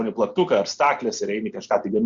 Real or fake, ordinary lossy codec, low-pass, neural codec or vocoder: real; Opus, 64 kbps; 7.2 kHz; none